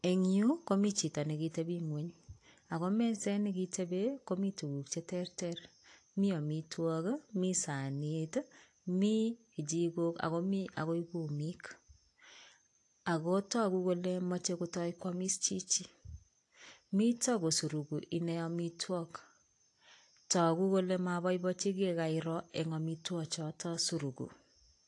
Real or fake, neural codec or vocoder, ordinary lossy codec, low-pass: real; none; AAC, 48 kbps; 10.8 kHz